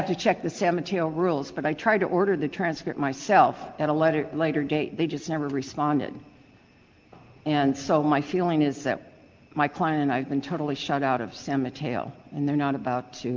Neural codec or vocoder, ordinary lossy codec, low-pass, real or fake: none; Opus, 32 kbps; 7.2 kHz; real